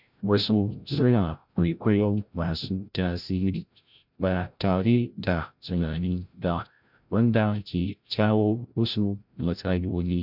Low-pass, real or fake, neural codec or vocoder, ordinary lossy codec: 5.4 kHz; fake; codec, 16 kHz, 0.5 kbps, FreqCodec, larger model; none